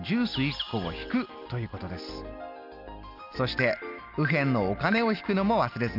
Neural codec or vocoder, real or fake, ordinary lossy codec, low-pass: none; real; Opus, 32 kbps; 5.4 kHz